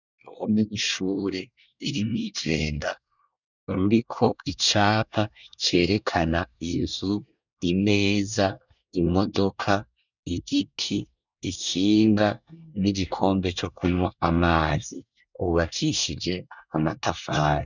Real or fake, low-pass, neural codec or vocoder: fake; 7.2 kHz; codec, 24 kHz, 1 kbps, SNAC